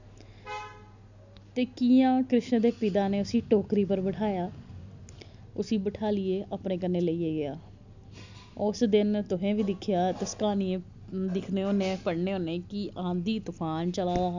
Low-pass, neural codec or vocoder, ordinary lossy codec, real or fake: 7.2 kHz; none; none; real